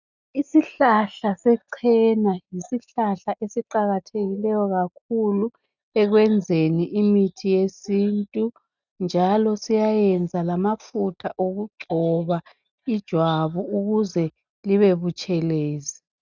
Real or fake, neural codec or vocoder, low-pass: real; none; 7.2 kHz